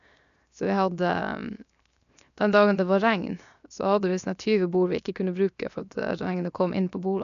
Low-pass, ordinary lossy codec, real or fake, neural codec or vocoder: 7.2 kHz; none; fake; codec, 16 kHz, 0.7 kbps, FocalCodec